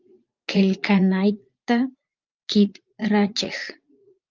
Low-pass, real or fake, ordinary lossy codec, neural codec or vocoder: 7.2 kHz; fake; Opus, 24 kbps; vocoder, 44.1 kHz, 80 mel bands, Vocos